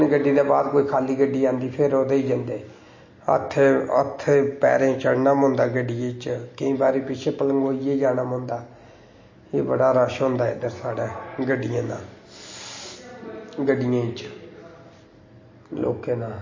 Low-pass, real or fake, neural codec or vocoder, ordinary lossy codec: 7.2 kHz; real; none; MP3, 32 kbps